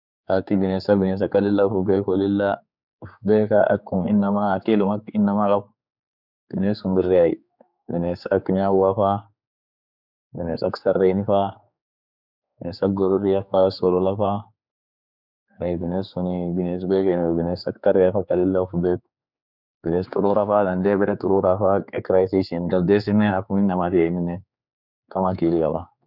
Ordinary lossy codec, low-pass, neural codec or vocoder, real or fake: none; 5.4 kHz; codec, 16 kHz, 4 kbps, X-Codec, HuBERT features, trained on general audio; fake